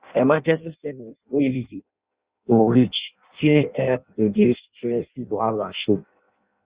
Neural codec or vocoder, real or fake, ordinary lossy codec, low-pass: codec, 16 kHz in and 24 kHz out, 0.6 kbps, FireRedTTS-2 codec; fake; Opus, 64 kbps; 3.6 kHz